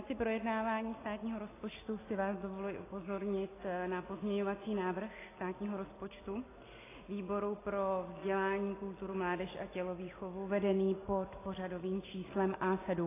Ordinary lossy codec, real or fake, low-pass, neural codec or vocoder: AAC, 16 kbps; real; 3.6 kHz; none